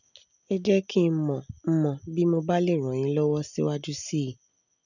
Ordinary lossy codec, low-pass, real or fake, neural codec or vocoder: none; 7.2 kHz; real; none